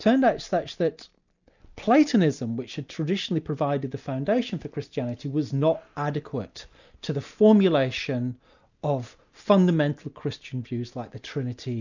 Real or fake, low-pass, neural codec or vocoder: real; 7.2 kHz; none